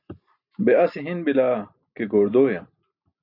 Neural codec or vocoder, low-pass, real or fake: none; 5.4 kHz; real